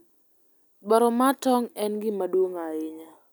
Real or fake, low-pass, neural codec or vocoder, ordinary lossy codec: real; 19.8 kHz; none; none